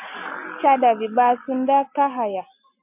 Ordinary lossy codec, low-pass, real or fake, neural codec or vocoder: MP3, 32 kbps; 3.6 kHz; real; none